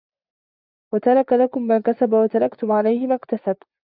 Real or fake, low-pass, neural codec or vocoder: fake; 5.4 kHz; vocoder, 24 kHz, 100 mel bands, Vocos